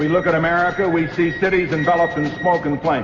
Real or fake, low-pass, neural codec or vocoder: real; 7.2 kHz; none